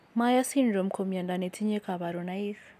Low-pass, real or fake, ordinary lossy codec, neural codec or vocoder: 14.4 kHz; real; none; none